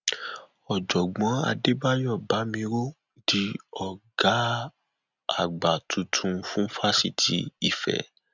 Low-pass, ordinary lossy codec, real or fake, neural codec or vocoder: 7.2 kHz; none; real; none